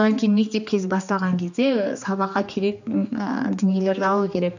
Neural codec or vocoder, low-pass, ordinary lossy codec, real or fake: codec, 16 kHz, 2 kbps, X-Codec, HuBERT features, trained on general audio; 7.2 kHz; none; fake